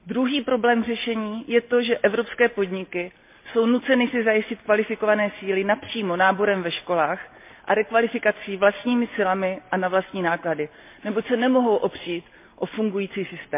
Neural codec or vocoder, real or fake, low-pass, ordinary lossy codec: none; real; 3.6 kHz; MP3, 32 kbps